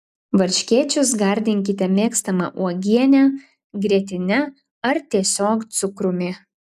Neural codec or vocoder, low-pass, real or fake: none; 14.4 kHz; real